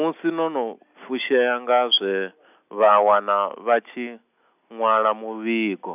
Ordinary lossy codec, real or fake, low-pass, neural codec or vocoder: none; real; 3.6 kHz; none